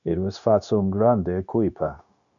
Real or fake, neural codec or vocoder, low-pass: fake; codec, 16 kHz, 0.9 kbps, LongCat-Audio-Codec; 7.2 kHz